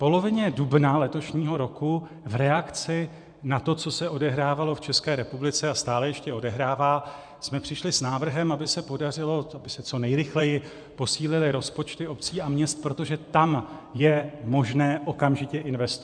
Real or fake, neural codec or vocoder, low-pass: fake; vocoder, 24 kHz, 100 mel bands, Vocos; 9.9 kHz